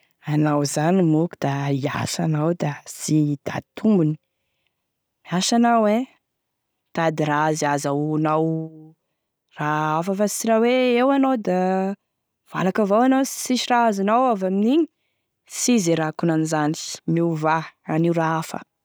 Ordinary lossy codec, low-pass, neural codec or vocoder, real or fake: none; none; none; real